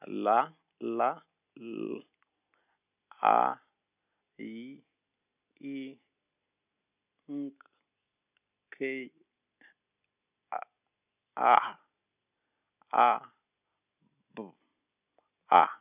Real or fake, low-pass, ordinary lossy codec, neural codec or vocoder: real; 3.6 kHz; none; none